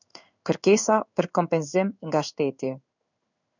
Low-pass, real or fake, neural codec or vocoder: 7.2 kHz; fake; codec, 16 kHz in and 24 kHz out, 1 kbps, XY-Tokenizer